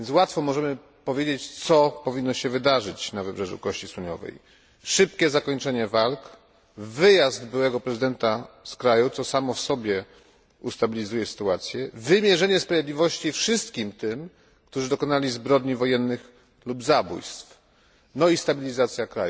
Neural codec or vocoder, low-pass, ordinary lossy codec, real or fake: none; none; none; real